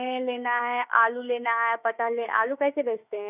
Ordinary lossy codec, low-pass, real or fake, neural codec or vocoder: none; 3.6 kHz; fake; autoencoder, 48 kHz, 32 numbers a frame, DAC-VAE, trained on Japanese speech